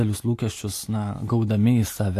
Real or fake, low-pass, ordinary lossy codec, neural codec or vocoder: real; 14.4 kHz; AAC, 64 kbps; none